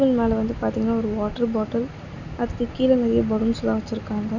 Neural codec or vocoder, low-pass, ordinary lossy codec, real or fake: none; 7.2 kHz; none; real